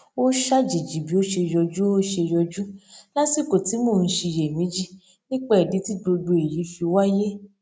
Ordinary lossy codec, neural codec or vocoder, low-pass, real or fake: none; none; none; real